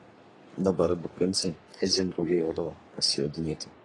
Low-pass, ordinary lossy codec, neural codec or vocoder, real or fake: 10.8 kHz; AAC, 32 kbps; codec, 44.1 kHz, 3.4 kbps, Pupu-Codec; fake